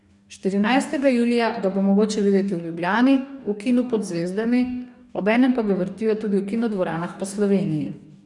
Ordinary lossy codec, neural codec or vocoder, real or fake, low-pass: none; codec, 44.1 kHz, 2.6 kbps, DAC; fake; 10.8 kHz